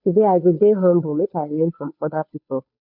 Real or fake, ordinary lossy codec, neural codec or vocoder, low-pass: fake; MP3, 48 kbps; codec, 16 kHz, 2 kbps, FunCodec, trained on Chinese and English, 25 frames a second; 5.4 kHz